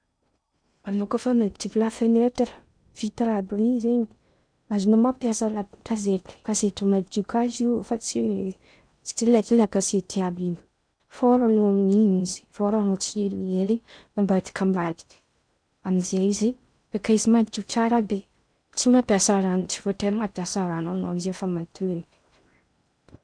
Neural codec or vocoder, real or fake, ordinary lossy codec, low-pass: codec, 16 kHz in and 24 kHz out, 0.6 kbps, FocalCodec, streaming, 2048 codes; fake; none; 9.9 kHz